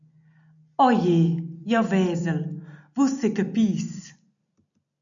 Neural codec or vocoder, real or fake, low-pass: none; real; 7.2 kHz